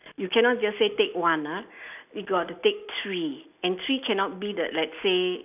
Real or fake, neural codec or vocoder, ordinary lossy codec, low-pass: real; none; none; 3.6 kHz